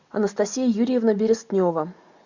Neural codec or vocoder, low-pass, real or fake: none; 7.2 kHz; real